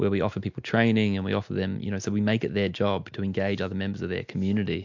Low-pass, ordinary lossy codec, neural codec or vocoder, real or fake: 7.2 kHz; MP3, 64 kbps; none; real